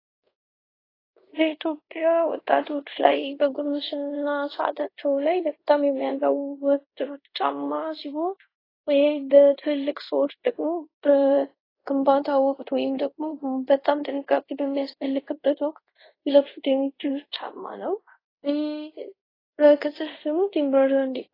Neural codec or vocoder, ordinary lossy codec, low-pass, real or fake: codec, 24 kHz, 0.5 kbps, DualCodec; AAC, 24 kbps; 5.4 kHz; fake